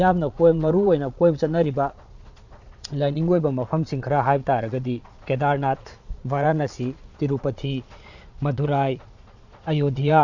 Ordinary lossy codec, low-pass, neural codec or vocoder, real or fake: none; 7.2 kHz; vocoder, 22.05 kHz, 80 mel bands, Vocos; fake